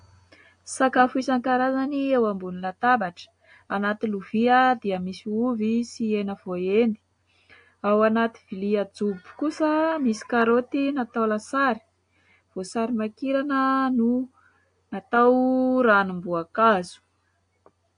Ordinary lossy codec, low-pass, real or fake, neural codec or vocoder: AAC, 48 kbps; 9.9 kHz; real; none